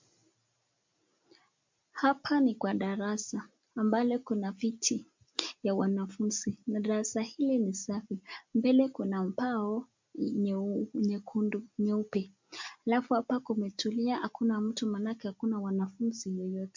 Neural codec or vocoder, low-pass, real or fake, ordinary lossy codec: none; 7.2 kHz; real; MP3, 48 kbps